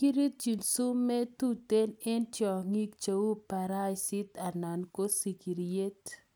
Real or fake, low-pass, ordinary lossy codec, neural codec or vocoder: real; none; none; none